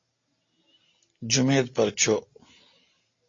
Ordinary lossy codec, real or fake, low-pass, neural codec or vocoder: AAC, 32 kbps; real; 7.2 kHz; none